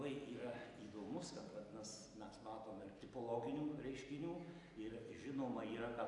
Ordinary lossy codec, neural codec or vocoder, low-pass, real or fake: AAC, 64 kbps; none; 10.8 kHz; real